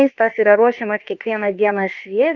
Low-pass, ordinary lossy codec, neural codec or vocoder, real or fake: 7.2 kHz; Opus, 24 kbps; codec, 16 kHz, about 1 kbps, DyCAST, with the encoder's durations; fake